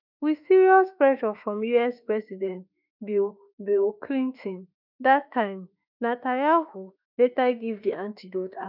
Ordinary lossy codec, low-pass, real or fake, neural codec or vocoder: none; 5.4 kHz; fake; autoencoder, 48 kHz, 32 numbers a frame, DAC-VAE, trained on Japanese speech